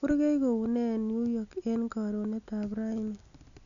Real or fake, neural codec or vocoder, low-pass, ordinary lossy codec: real; none; 7.2 kHz; none